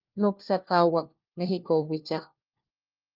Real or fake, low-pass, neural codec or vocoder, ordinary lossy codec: fake; 5.4 kHz; codec, 16 kHz, 1 kbps, FunCodec, trained on LibriTTS, 50 frames a second; Opus, 32 kbps